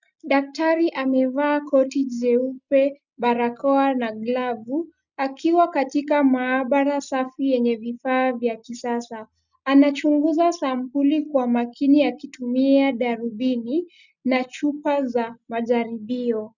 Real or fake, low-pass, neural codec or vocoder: real; 7.2 kHz; none